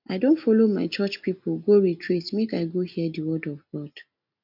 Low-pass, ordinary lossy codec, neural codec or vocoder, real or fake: 5.4 kHz; MP3, 48 kbps; none; real